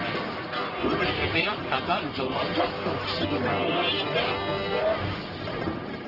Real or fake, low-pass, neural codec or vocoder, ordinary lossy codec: fake; 5.4 kHz; codec, 44.1 kHz, 1.7 kbps, Pupu-Codec; Opus, 24 kbps